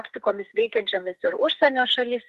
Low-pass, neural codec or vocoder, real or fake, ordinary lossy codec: 14.4 kHz; codec, 44.1 kHz, 2.6 kbps, SNAC; fake; Opus, 16 kbps